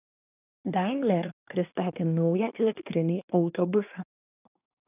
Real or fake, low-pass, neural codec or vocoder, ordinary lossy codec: fake; 3.6 kHz; codec, 24 kHz, 1 kbps, SNAC; AAC, 32 kbps